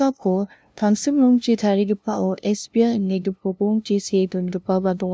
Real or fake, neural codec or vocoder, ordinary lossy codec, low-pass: fake; codec, 16 kHz, 0.5 kbps, FunCodec, trained on LibriTTS, 25 frames a second; none; none